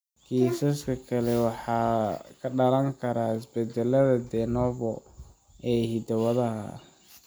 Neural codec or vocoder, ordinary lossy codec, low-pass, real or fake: none; none; none; real